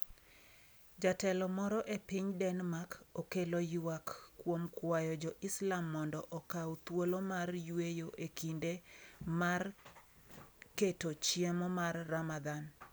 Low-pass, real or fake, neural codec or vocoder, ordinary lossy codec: none; real; none; none